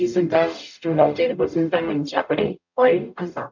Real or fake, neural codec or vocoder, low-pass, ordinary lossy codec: fake; codec, 44.1 kHz, 0.9 kbps, DAC; 7.2 kHz; none